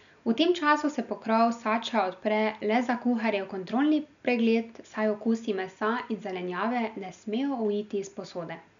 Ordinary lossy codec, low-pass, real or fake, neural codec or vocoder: none; 7.2 kHz; real; none